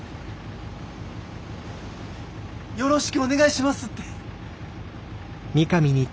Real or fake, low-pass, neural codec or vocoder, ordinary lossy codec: real; none; none; none